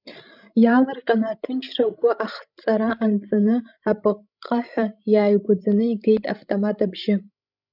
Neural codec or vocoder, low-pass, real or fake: codec, 16 kHz, 16 kbps, FreqCodec, larger model; 5.4 kHz; fake